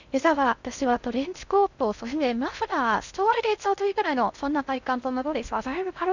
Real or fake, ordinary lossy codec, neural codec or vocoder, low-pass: fake; none; codec, 16 kHz in and 24 kHz out, 0.6 kbps, FocalCodec, streaming, 2048 codes; 7.2 kHz